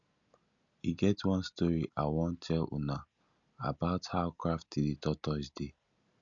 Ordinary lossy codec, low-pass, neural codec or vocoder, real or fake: none; 7.2 kHz; none; real